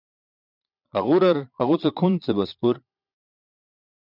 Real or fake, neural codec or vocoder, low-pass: fake; vocoder, 22.05 kHz, 80 mel bands, Vocos; 5.4 kHz